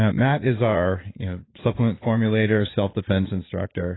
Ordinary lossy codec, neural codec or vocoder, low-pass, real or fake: AAC, 16 kbps; vocoder, 44.1 kHz, 80 mel bands, Vocos; 7.2 kHz; fake